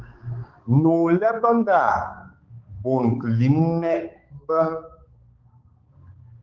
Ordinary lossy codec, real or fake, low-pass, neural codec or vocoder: Opus, 16 kbps; fake; 7.2 kHz; codec, 16 kHz, 4 kbps, X-Codec, HuBERT features, trained on balanced general audio